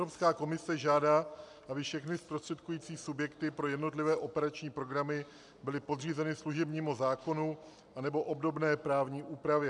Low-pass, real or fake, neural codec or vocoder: 10.8 kHz; real; none